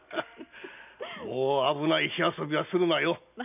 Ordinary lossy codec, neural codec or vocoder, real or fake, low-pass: none; none; real; 3.6 kHz